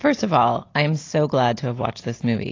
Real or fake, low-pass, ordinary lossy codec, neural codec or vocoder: real; 7.2 kHz; AAC, 32 kbps; none